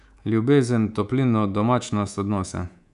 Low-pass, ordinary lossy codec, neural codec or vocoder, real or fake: 10.8 kHz; none; codec, 24 kHz, 3.1 kbps, DualCodec; fake